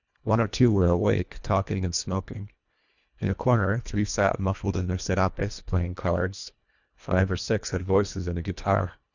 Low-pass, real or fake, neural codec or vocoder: 7.2 kHz; fake; codec, 24 kHz, 1.5 kbps, HILCodec